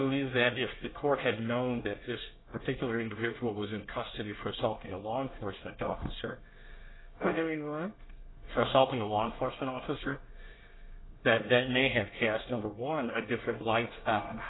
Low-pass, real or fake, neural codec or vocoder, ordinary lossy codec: 7.2 kHz; fake; codec, 24 kHz, 1 kbps, SNAC; AAC, 16 kbps